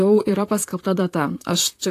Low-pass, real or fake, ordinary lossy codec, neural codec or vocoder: 14.4 kHz; fake; AAC, 64 kbps; vocoder, 44.1 kHz, 128 mel bands, Pupu-Vocoder